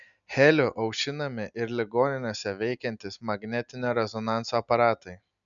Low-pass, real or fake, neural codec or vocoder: 7.2 kHz; real; none